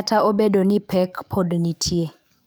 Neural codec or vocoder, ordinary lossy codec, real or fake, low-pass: codec, 44.1 kHz, 7.8 kbps, DAC; none; fake; none